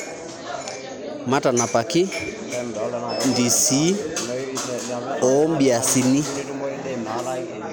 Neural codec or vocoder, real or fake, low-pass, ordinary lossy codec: none; real; none; none